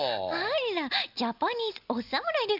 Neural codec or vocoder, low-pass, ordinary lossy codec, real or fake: none; 5.4 kHz; none; real